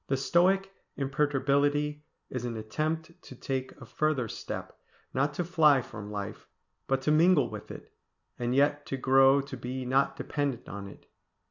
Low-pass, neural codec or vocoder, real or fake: 7.2 kHz; none; real